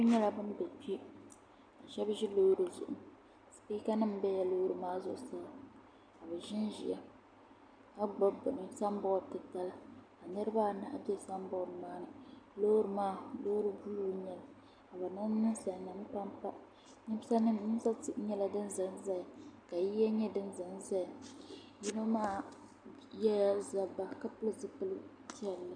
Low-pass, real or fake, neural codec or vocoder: 9.9 kHz; real; none